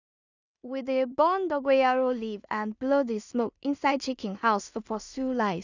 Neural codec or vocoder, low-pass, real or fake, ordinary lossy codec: codec, 16 kHz in and 24 kHz out, 0.4 kbps, LongCat-Audio-Codec, two codebook decoder; 7.2 kHz; fake; none